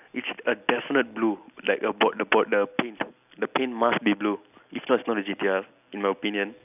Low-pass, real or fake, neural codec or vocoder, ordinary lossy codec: 3.6 kHz; real; none; none